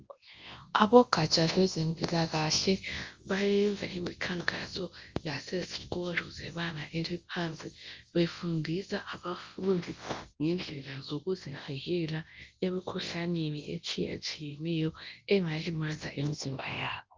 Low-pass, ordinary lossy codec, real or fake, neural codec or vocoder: 7.2 kHz; Opus, 32 kbps; fake; codec, 24 kHz, 0.9 kbps, WavTokenizer, large speech release